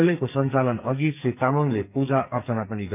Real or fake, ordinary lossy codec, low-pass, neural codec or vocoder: fake; none; 3.6 kHz; codec, 44.1 kHz, 2.6 kbps, SNAC